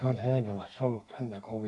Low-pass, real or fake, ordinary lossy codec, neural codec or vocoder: 10.8 kHz; fake; none; codec, 32 kHz, 1.9 kbps, SNAC